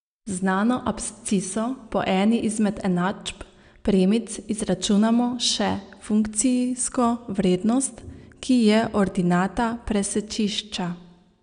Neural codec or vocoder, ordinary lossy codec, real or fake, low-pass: none; none; real; 9.9 kHz